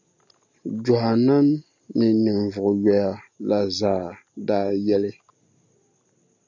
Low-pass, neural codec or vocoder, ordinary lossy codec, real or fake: 7.2 kHz; none; MP3, 64 kbps; real